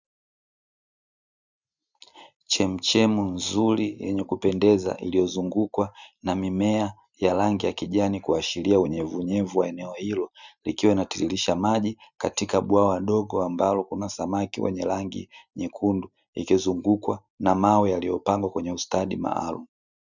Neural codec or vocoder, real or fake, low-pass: none; real; 7.2 kHz